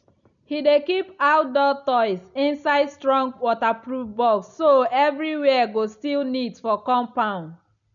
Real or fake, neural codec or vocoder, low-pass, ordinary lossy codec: real; none; 7.2 kHz; none